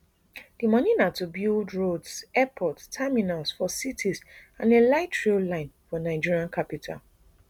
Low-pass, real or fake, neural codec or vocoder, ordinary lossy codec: 19.8 kHz; real; none; none